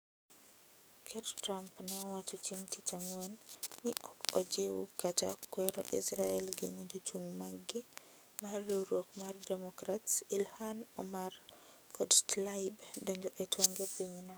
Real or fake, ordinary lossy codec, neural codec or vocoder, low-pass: fake; none; codec, 44.1 kHz, 7.8 kbps, DAC; none